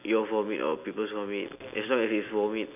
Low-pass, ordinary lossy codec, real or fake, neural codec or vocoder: 3.6 kHz; none; real; none